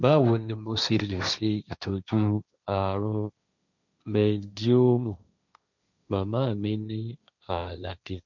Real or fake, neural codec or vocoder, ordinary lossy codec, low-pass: fake; codec, 16 kHz, 1.1 kbps, Voila-Tokenizer; none; 7.2 kHz